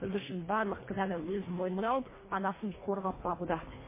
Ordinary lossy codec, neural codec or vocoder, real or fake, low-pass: MP3, 16 kbps; codec, 24 kHz, 1.5 kbps, HILCodec; fake; 3.6 kHz